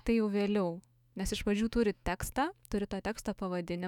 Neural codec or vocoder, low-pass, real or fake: autoencoder, 48 kHz, 128 numbers a frame, DAC-VAE, trained on Japanese speech; 19.8 kHz; fake